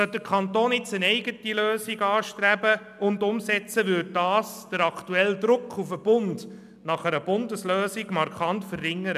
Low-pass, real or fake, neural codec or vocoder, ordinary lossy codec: 14.4 kHz; real; none; none